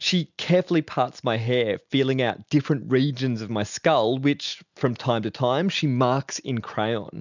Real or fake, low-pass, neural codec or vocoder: real; 7.2 kHz; none